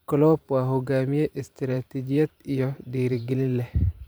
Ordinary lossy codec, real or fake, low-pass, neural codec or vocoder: none; fake; none; vocoder, 44.1 kHz, 128 mel bands every 512 samples, BigVGAN v2